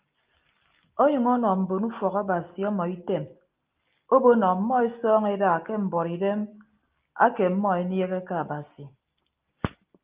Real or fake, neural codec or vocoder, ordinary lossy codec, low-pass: real; none; Opus, 32 kbps; 3.6 kHz